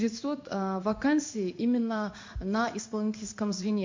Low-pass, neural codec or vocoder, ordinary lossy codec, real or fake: 7.2 kHz; codec, 16 kHz in and 24 kHz out, 1 kbps, XY-Tokenizer; MP3, 48 kbps; fake